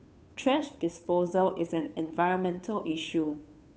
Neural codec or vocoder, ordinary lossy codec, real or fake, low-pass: codec, 16 kHz, 2 kbps, FunCodec, trained on Chinese and English, 25 frames a second; none; fake; none